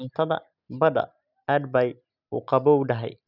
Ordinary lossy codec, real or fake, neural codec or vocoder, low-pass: none; real; none; 5.4 kHz